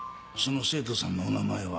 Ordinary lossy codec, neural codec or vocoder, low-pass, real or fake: none; none; none; real